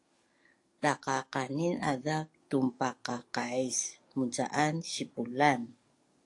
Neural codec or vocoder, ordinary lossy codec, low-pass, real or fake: codec, 44.1 kHz, 7.8 kbps, DAC; AAC, 48 kbps; 10.8 kHz; fake